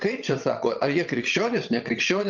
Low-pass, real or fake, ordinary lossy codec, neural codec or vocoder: 7.2 kHz; fake; Opus, 24 kbps; vocoder, 22.05 kHz, 80 mel bands, WaveNeXt